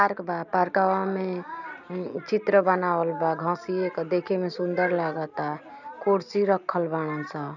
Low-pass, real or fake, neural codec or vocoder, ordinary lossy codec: 7.2 kHz; real; none; none